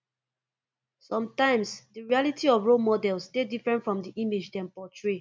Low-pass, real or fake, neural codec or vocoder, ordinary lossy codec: none; real; none; none